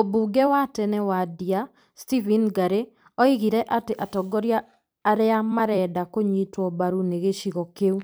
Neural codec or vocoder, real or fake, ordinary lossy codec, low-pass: vocoder, 44.1 kHz, 128 mel bands every 256 samples, BigVGAN v2; fake; none; none